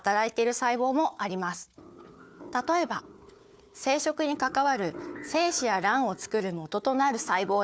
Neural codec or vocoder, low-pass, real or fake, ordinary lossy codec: codec, 16 kHz, 4 kbps, FunCodec, trained on Chinese and English, 50 frames a second; none; fake; none